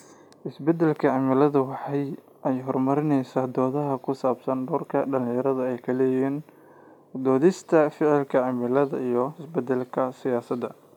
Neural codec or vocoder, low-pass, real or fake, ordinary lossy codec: none; 19.8 kHz; real; none